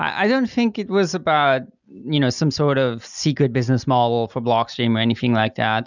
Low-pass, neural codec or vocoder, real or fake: 7.2 kHz; none; real